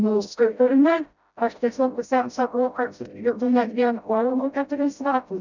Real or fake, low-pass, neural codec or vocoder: fake; 7.2 kHz; codec, 16 kHz, 0.5 kbps, FreqCodec, smaller model